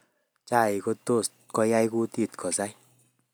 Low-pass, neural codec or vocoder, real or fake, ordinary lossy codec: none; none; real; none